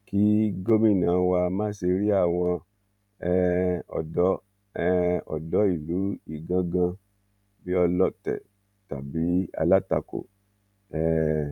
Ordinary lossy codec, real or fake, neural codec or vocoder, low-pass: none; fake; vocoder, 44.1 kHz, 128 mel bands every 512 samples, BigVGAN v2; 19.8 kHz